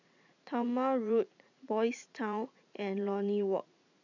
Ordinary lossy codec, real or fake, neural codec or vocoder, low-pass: none; fake; vocoder, 44.1 kHz, 80 mel bands, Vocos; 7.2 kHz